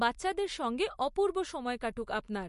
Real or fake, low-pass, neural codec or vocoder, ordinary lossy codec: real; 14.4 kHz; none; MP3, 48 kbps